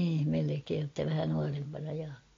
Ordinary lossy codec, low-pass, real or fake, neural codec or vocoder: AAC, 32 kbps; 7.2 kHz; real; none